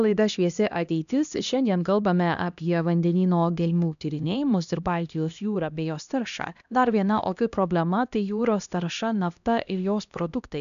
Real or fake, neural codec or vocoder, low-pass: fake; codec, 16 kHz, 1 kbps, X-Codec, HuBERT features, trained on LibriSpeech; 7.2 kHz